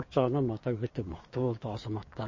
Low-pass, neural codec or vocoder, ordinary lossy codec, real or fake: 7.2 kHz; codec, 16 kHz, 6 kbps, DAC; MP3, 32 kbps; fake